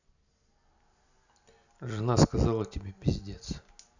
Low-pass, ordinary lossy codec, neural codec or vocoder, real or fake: 7.2 kHz; none; none; real